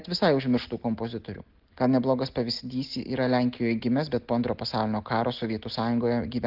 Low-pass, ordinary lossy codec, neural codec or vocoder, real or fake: 5.4 kHz; Opus, 16 kbps; none; real